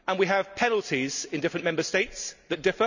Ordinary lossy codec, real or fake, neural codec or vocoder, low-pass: none; real; none; 7.2 kHz